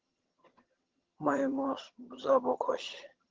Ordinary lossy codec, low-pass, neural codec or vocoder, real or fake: Opus, 16 kbps; 7.2 kHz; vocoder, 22.05 kHz, 80 mel bands, HiFi-GAN; fake